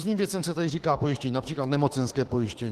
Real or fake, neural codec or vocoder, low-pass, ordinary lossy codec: fake; autoencoder, 48 kHz, 32 numbers a frame, DAC-VAE, trained on Japanese speech; 14.4 kHz; Opus, 16 kbps